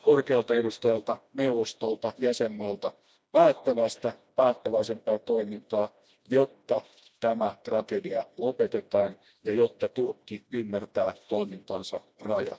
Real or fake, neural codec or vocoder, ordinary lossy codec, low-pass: fake; codec, 16 kHz, 1 kbps, FreqCodec, smaller model; none; none